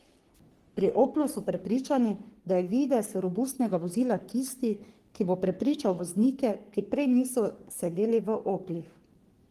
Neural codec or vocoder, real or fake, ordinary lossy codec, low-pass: codec, 44.1 kHz, 3.4 kbps, Pupu-Codec; fake; Opus, 24 kbps; 14.4 kHz